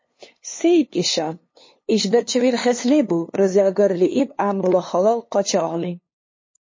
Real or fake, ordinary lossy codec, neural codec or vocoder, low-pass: fake; MP3, 32 kbps; codec, 16 kHz, 2 kbps, FunCodec, trained on LibriTTS, 25 frames a second; 7.2 kHz